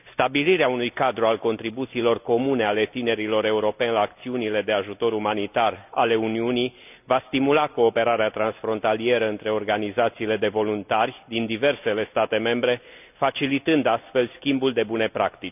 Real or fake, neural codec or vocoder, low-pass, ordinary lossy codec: real; none; 3.6 kHz; none